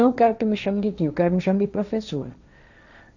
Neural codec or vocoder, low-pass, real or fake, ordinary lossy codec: codec, 16 kHz, 1.1 kbps, Voila-Tokenizer; 7.2 kHz; fake; none